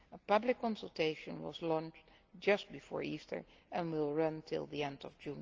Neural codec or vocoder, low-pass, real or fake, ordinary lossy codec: none; 7.2 kHz; real; Opus, 24 kbps